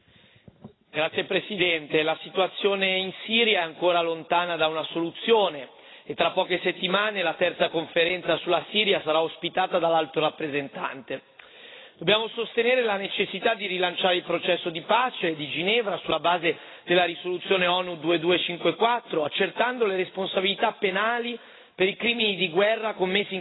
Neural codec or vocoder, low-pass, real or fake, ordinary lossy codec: none; 7.2 kHz; real; AAC, 16 kbps